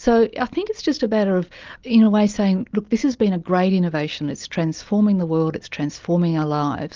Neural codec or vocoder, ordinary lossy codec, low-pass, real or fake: none; Opus, 32 kbps; 7.2 kHz; real